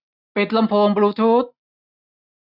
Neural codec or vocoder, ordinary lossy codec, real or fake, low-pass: none; none; real; 5.4 kHz